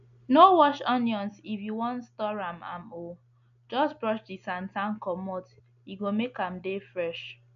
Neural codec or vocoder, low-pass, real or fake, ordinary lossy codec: none; 7.2 kHz; real; none